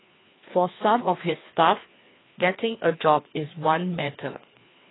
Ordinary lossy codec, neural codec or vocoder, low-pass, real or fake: AAC, 16 kbps; codec, 16 kHz, 2 kbps, FreqCodec, larger model; 7.2 kHz; fake